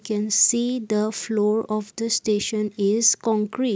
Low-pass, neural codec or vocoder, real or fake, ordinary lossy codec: none; none; real; none